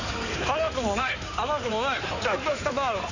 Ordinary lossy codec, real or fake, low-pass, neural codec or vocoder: none; fake; 7.2 kHz; codec, 16 kHz in and 24 kHz out, 2.2 kbps, FireRedTTS-2 codec